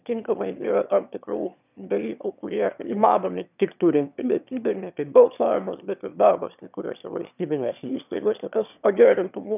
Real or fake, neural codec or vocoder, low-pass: fake; autoencoder, 22.05 kHz, a latent of 192 numbers a frame, VITS, trained on one speaker; 3.6 kHz